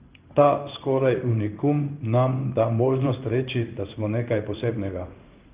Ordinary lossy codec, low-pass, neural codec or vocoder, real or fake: Opus, 32 kbps; 3.6 kHz; codec, 16 kHz in and 24 kHz out, 1 kbps, XY-Tokenizer; fake